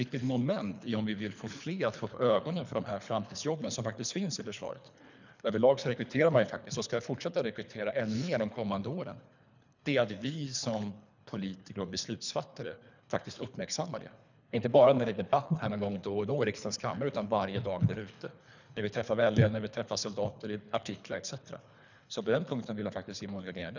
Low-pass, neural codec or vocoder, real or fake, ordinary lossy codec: 7.2 kHz; codec, 24 kHz, 3 kbps, HILCodec; fake; none